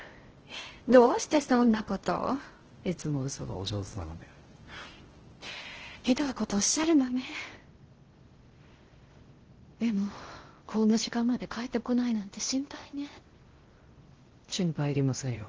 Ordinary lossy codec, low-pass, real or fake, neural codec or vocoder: Opus, 16 kbps; 7.2 kHz; fake; codec, 16 kHz in and 24 kHz out, 0.6 kbps, FocalCodec, streaming, 4096 codes